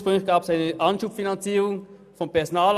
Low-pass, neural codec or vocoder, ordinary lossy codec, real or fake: 14.4 kHz; none; none; real